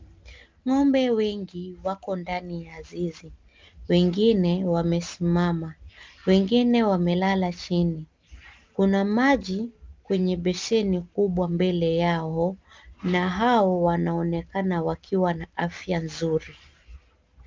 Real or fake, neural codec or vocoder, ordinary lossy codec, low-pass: real; none; Opus, 24 kbps; 7.2 kHz